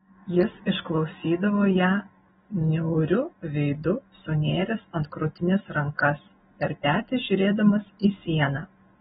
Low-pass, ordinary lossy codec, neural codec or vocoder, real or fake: 7.2 kHz; AAC, 16 kbps; none; real